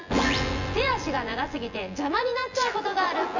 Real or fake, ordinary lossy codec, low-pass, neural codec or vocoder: fake; none; 7.2 kHz; vocoder, 24 kHz, 100 mel bands, Vocos